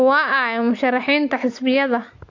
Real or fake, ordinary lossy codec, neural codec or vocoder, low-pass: real; AAC, 48 kbps; none; 7.2 kHz